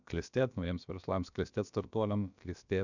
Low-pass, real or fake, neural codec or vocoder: 7.2 kHz; fake; codec, 16 kHz, about 1 kbps, DyCAST, with the encoder's durations